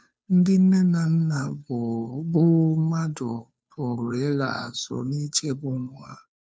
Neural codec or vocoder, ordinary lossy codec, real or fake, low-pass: codec, 16 kHz, 2 kbps, FunCodec, trained on Chinese and English, 25 frames a second; none; fake; none